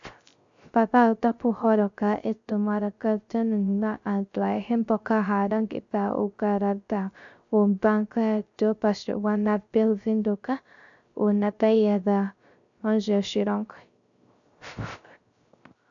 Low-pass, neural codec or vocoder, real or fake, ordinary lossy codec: 7.2 kHz; codec, 16 kHz, 0.3 kbps, FocalCodec; fake; AAC, 64 kbps